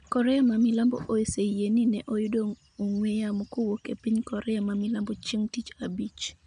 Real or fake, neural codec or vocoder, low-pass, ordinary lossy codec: real; none; 9.9 kHz; none